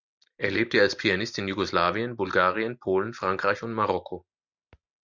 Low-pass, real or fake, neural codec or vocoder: 7.2 kHz; real; none